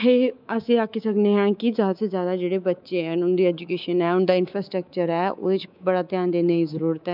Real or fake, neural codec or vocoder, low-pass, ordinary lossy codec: fake; codec, 24 kHz, 3.1 kbps, DualCodec; 5.4 kHz; none